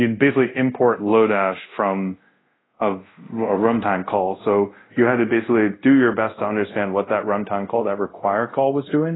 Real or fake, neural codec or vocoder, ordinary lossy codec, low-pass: fake; codec, 24 kHz, 0.5 kbps, DualCodec; AAC, 16 kbps; 7.2 kHz